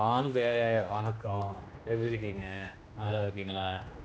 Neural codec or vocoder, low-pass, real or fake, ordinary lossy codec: codec, 16 kHz, 1 kbps, X-Codec, HuBERT features, trained on general audio; none; fake; none